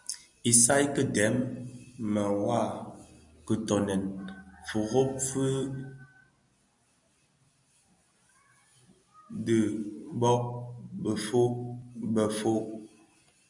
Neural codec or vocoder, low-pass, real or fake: none; 10.8 kHz; real